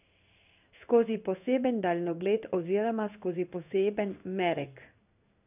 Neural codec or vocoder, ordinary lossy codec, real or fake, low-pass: codec, 16 kHz in and 24 kHz out, 1 kbps, XY-Tokenizer; none; fake; 3.6 kHz